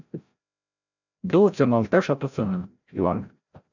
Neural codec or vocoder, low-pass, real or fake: codec, 16 kHz, 0.5 kbps, FreqCodec, larger model; 7.2 kHz; fake